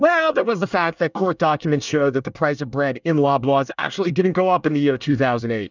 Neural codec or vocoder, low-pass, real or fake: codec, 24 kHz, 1 kbps, SNAC; 7.2 kHz; fake